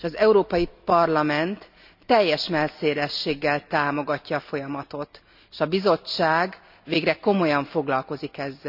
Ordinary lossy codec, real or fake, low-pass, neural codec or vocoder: none; real; 5.4 kHz; none